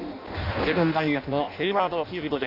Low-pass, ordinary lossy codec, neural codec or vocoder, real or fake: 5.4 kHz; none; codec, 16 kHz in and 24 kHz out, 0.6 kbps, FireRedTTS-2 codec; fake